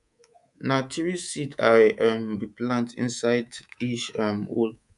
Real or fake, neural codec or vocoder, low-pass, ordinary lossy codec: fake; codec, 24 kHz, 3.1 kbps, DualCodec; 10.8 kHz; none